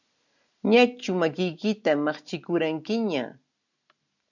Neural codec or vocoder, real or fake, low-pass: none; real; 7.2 kHz